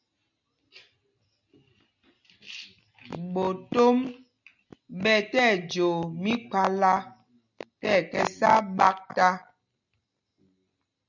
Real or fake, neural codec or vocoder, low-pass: real; none; 7.2 kHz